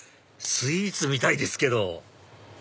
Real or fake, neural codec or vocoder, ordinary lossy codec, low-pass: real; none; none; none